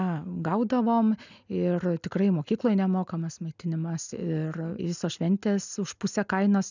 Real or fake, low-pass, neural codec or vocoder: real; 7.2 kHz; none